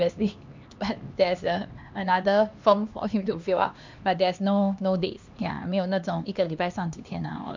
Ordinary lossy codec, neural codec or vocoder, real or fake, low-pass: MP3, 64 kbps; codec, 16 kHz, 2 kbps, X-Codec, HuBERT features, trained on LibriSpeech; fake; 7.2 kHz